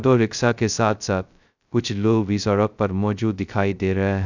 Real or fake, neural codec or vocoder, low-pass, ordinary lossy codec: fake; codec, 16 kHz, 0.2 kbps, FocalCodec; 7.2 kHz; none